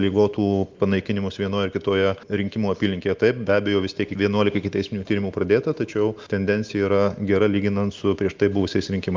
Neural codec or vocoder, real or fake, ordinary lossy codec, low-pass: none; real; Opus, 24 kbps; 7.2 kHz